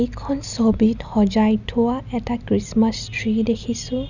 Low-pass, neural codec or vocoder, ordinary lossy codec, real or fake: 7.2 kHz; none; none; real